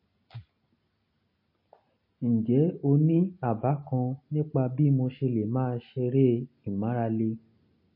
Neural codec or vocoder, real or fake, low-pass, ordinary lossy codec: none; real; 5.4 kHz; MP3, 24 kbps